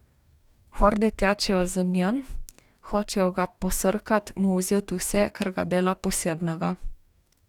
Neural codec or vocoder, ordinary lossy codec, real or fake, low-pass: codec, 44.1 kHz, 2.6 kbps, DAC; none; fake; 19.8 kHz